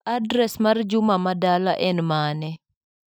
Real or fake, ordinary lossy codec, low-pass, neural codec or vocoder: real; none; none; none